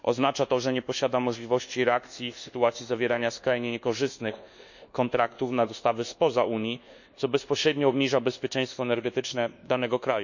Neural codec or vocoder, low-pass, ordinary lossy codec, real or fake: codec, 24 kHz, 1.2 kbps, DualCodec; 7.2 kHz; none; fake